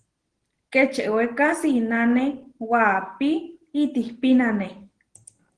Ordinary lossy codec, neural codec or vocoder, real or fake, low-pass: Opus, 16 kbps; none; real; 9.9 kHz